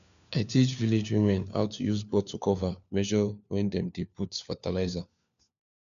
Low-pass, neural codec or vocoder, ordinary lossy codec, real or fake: 7.2 kHz; codec, 16 kHz, 4 kbps, FunCodec, trained on LibriTTS, 50 frames a second; none; fake